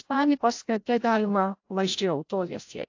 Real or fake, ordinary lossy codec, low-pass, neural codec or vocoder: fake; AAC, 48 kbps; 7.2 kHz; codec, 16 kHz, 0.5 kbps, FreqCodec, larger model